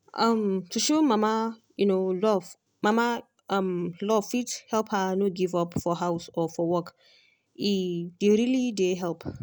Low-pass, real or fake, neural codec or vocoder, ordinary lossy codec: none; real; none; none